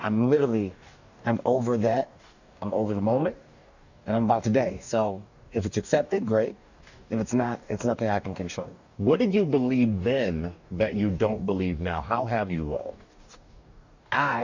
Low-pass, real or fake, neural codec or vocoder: 7.2 kHz; fake; codec, 44.1 kHz, 2.6 kbps, DAC